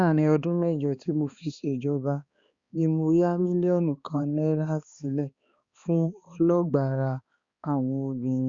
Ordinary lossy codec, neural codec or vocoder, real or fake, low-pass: Opus, 64 kbps; codec, 16 kHz, 2 kbps, X-Codec, HuBERT features, trained on balanced general audio; fake; 7.2 kHz